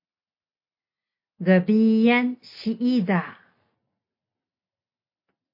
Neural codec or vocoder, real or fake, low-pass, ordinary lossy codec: none; real; 5.4 kHz; MP3, 32 kbps